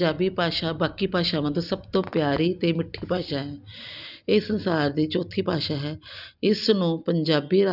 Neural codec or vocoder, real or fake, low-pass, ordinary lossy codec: none; real; 5.4 kHz; none